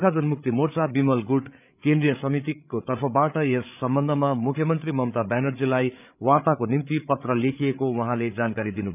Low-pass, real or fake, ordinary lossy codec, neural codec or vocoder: 3.6 kHz; fake; none; codec, 16 kHz, 8 kbps, FreqCodec, larger model